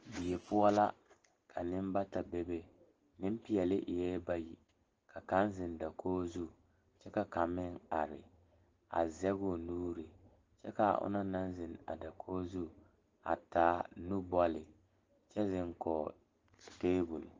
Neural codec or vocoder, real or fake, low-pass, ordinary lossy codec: none; real; 7.2 kHz; Opus, 16 kbps